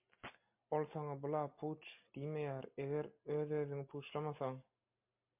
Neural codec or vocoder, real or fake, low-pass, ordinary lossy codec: none; real; 3.6 kHz; MP3, 32 kbps